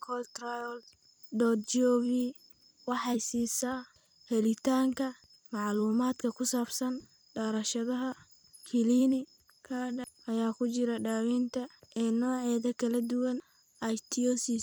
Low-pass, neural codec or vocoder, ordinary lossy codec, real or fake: none; vocoder, 44.1 kHz, 128 mel bands every 256 samples, BigVGAN v2; none; fake